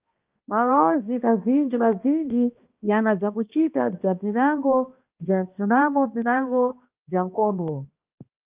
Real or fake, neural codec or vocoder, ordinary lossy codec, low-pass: fake; codec, 16 kHz, 1 kbps, X-Codec, HuBERT features, trained on balanced general audio; Opus, 24 kbps; 3.6 kHz